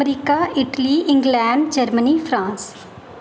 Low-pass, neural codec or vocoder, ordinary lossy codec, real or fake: none; none; none; real